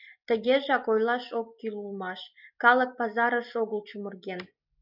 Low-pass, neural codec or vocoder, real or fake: 5.4 kHz; none; real